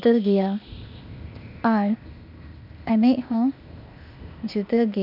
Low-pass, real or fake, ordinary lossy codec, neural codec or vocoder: 5.4 kHz; fake; none; codec, 16 kHz, 0.8 kbps, ZipCodec